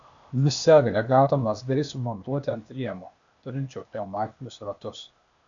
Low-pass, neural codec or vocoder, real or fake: 7.2 kHz; codec, 16 kHz, 0.8 kbps, ZipCodec; fake